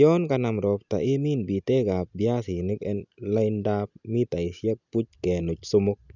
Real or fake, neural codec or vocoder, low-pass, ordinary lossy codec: real; none; 7.2 kHz; none